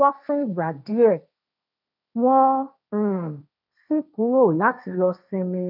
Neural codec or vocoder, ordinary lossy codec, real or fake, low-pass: codec, 16 kHz, 1.1 kbps, Voila-Tokenizer; none; fake; 5.4 kHz